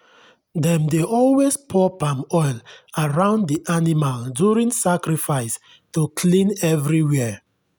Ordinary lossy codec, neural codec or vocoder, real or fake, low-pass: none; none; real; none